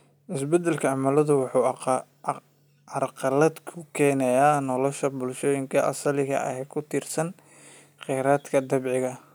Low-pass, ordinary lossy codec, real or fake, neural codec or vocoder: none; none; real; none